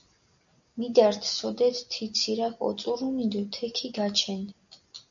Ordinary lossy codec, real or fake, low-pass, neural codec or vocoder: MP3, 96 kbps; real; 7.2 kHz; none